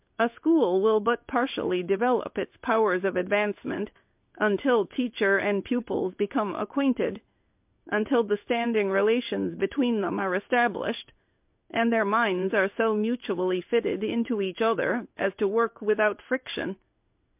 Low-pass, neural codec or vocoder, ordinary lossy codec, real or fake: 3.6 kHz; codec, 16 kHz in and 24 kHz out, 1 kbps, XY-Tokenizer; MP3, 32 kbps; fake